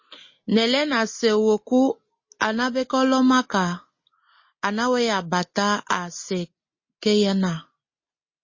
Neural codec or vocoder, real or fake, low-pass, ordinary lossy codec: none; real; 7.2 kHz; MP3, 32 kbps